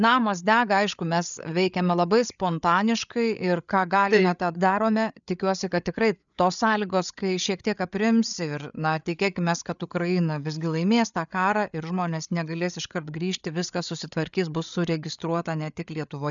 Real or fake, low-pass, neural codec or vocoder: fake; 7.2 kHz; codec, 16 kHz, 8 kbps, FreqCodec, larger model